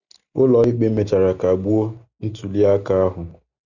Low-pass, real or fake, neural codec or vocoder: 7.2 kHz; real; none